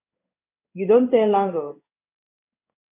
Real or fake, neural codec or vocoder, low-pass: fake; codec, 16 kHz in and 24 kHz out, 1 kbps, XY-Tokenizer; 3.6 kHz